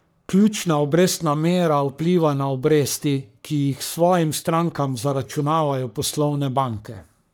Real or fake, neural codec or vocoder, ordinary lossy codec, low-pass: fake; codec, 44.1 kHz, 3.4 kbps, Pupu-Codec; none; none